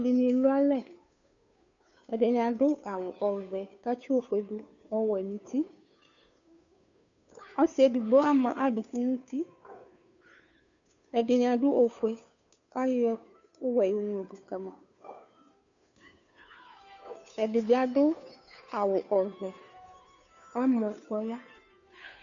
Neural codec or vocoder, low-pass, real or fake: codec, 16 kHz, 2 kbps, FunCodec, trained on Chinese and English, 25 frames a second; 7.2 kHz; fake